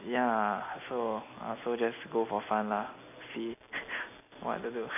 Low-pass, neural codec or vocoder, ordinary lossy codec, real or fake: 3.6 kHz; none; none; real